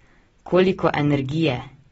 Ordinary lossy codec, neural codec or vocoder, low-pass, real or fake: AAC, 24 kbps; none; 19.8 kHz; real